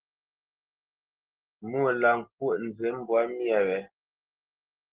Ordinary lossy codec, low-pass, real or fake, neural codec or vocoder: Opus, 16 kbps; 3.6 kHz; real; none